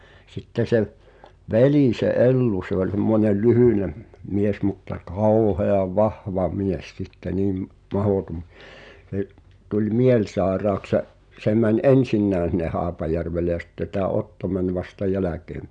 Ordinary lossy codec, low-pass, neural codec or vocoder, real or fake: none; 9.9 kHz; none; real